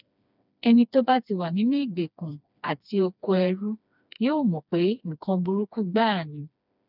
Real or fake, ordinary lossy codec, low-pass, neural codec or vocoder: fake; none; 5.4 kHz; codec, 16 kHz, 2 kbps, FreqCodec, smaller model